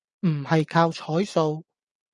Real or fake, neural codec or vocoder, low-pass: fake; vocoder, 24 kHz, 100 mel bands, Vocos; 10.8 kHz